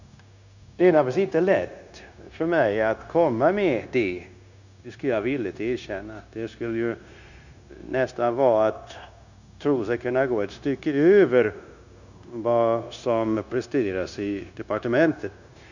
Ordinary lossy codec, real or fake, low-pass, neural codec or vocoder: none; fake; 7.2 kHz; codec, 16 kHz, 0.9 kbps, LongCat-Audio-Codec